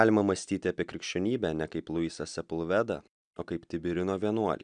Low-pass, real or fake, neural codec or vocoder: 9.9 kHz; real; none